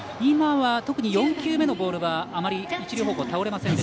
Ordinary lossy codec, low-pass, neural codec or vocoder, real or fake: none; none; none; real